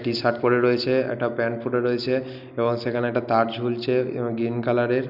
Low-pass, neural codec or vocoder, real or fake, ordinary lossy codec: 5.4 kHz; none; real; none